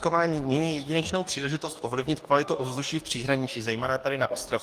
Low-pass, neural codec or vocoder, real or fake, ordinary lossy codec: 14.4 kHz; codec, 44.1 kHz, 2.6 kbps, DAC; fake; Opus, 32 kbps